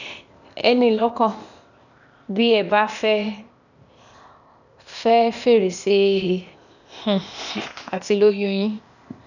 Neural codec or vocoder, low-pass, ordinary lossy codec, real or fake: codec, 16 kHz, 0.8 kbps, ZipCodec; 7.2 kHz; none; fake